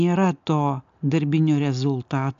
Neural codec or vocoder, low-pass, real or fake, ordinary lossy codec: none; 7.2 kHz; real; AAC, 64 kbps